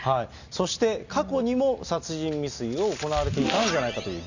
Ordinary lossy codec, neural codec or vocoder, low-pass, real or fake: none; none; 7.2 kHz; real